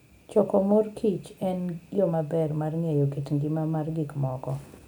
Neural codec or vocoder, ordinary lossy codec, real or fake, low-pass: none; none; real; none